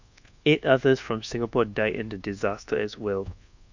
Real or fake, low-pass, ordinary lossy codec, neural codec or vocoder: fake; 7.2 kHz; none; codec, 24 kHz, 1.2 kbps, DualCodec